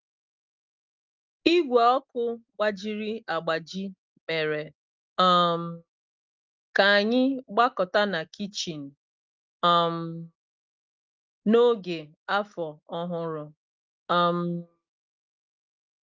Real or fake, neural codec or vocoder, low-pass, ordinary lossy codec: real; none; 7.2 kHz; Opus, 24 kbps